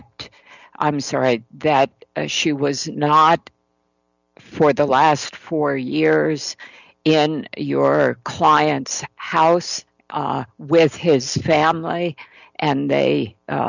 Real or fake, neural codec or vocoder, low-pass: real; none; 7.2 kHz